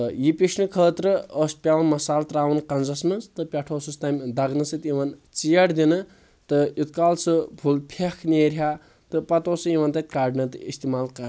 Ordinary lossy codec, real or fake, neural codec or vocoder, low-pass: none; real; none; none